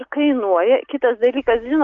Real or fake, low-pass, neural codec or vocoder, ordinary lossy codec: real; 7.2 kHz; none; Opus, 32 kbps